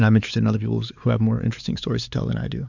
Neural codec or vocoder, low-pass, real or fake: codec, 16 kHz, 4 kbps, X-Codec, WavLM features, trained on Multilingual LibriSpeech; 7.2 kHz; fake